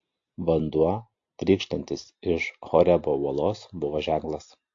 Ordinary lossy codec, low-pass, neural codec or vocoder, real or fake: AAC, 48 kbps; 7.2 kHz; none; real